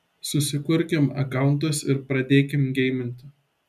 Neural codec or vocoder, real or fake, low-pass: none; real; 14.4 kHz